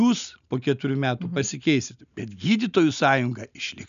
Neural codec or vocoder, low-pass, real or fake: none; 7.2 kHz; real